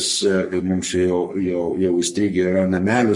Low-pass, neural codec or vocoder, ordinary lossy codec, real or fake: 10.8 kHz; codec, 44.1 kHz, 3.4 kbps, Pupu-Codec; MP3, 48 kbps; fake